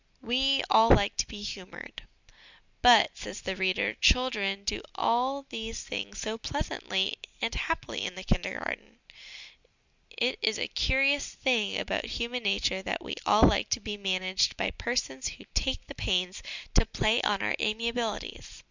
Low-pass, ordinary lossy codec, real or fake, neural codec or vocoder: 7.2 kHz; Opus, 64 kbps; real; none